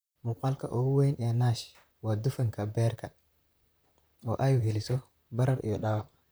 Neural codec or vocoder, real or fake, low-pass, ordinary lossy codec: vocoder, 44.1 kHz, 128 mel bands, Pupu-Vocoder; fake; none; none